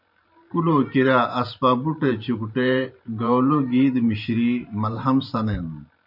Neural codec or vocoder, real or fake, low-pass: vocoder, 44.1 kHz, 128 mel bands every 512 samples, BigVGAN v2; fake; 5.4 kHz